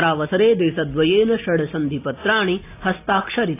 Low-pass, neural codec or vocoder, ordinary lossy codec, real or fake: 3.6 kHz; none; AAC, 24 kbps; real